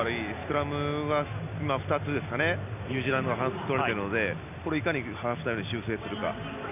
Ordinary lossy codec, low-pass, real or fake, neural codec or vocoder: none; 3.6 kHz; real; none